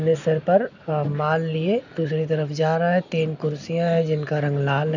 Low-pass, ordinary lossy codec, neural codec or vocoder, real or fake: 7.2 kHz; none; none; real